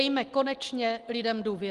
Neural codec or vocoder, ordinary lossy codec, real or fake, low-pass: none; Opus, 24 kbps; real; 10.8 kHz